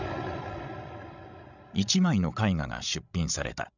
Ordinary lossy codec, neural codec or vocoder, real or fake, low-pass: none; codec, 16 kHz, 16 kbps, FreqCodec, larger model; fake; 7.2 kHz